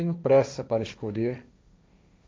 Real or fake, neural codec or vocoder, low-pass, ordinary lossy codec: fake; codec, 16 kHz, 1.1 kbps, Voila-Tokenizer; none; none